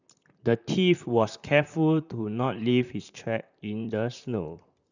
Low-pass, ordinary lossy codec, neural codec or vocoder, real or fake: 7.2 kHz; none; vocoder, 44.1 kHz, 128 mel bands, Pupu-Vocoder; fake